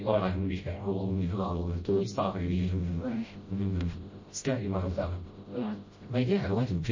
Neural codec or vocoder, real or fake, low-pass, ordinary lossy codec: codec, 16 kHz, 0.5 kbps, FreqCodec, smaller model; fake; 7.2 kHz; MP3, 32 kbps